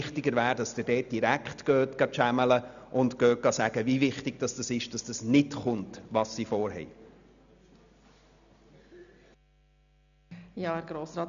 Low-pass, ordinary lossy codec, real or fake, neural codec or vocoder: 7.2 kHz; none; real; none